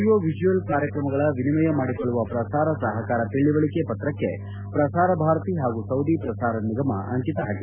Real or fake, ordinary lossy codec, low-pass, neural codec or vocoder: real; none; 3.6 kHz; none